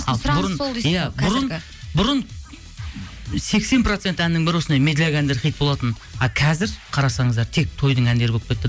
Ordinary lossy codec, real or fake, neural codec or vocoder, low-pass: none; real; none; none